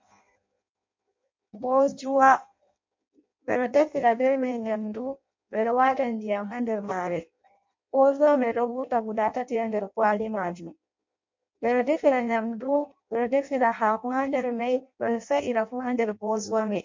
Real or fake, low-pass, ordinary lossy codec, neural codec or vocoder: fake; 7.2 kHz; MP3, 48 kbps; codec, 16 kHz in and 24 kHz out, 0.6 kbps, FireRedTTS-2 codec